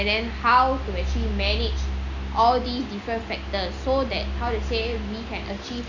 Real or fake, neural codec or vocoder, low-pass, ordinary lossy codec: real; none; 7.2 kHz; none